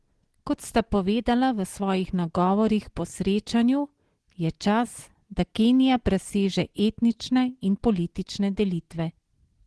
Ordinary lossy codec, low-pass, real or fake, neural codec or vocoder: Opus, 16 kbps; 10.8 kHz; real; none